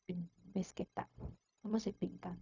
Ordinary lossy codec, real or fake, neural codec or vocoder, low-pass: none; fake; codec, 16 kHz, 0.4 kbps, LongCat-Audio-Codec; 7.2 kHz